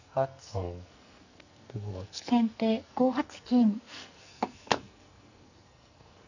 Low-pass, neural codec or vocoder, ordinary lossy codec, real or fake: 7.2 kHz; codec, 44.1 kHz, 2.6 kbps, SNAC; none; fake